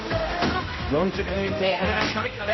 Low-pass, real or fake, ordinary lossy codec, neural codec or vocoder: 7.2 kHz; fake; MP3, 24 kbps; codec, 16 kHz, 0.5 kbps, X-Codec, HuBERT features, trained on general audio